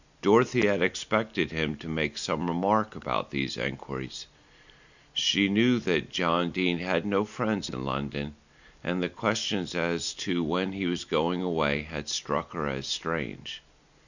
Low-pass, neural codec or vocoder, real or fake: 7.2 kHz; none; real